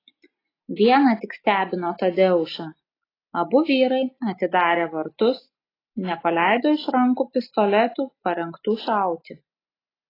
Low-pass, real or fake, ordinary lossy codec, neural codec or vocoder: 5.4 kHz; real; AAC, 24 kbps; none